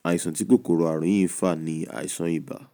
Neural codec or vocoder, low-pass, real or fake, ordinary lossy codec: none; none; real; none